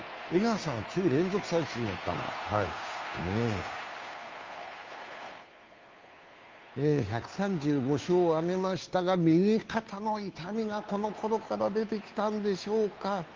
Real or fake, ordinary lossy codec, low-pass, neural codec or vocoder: fake; Opus, 32 kbps; 7.2 kHz; codec, 16 kHz, 2 kbps, FunCodec, trained on Chinese and English, 25 frames a second